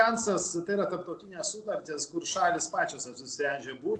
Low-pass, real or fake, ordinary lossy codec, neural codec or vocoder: 10.8 kHz; real; AAC, 64 kbps; none